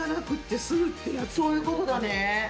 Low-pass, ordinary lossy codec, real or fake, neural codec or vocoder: none; none; real; none